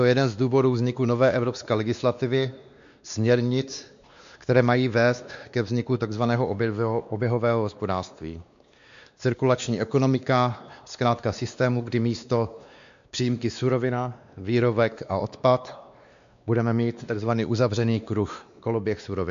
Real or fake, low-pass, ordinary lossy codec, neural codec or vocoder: fake; 7.2 kHz; MP3, 64 kbps; codec, 16 kHz, 2 kbps, X-Codec, WavLM features, trained on Multilingual LibriSpeech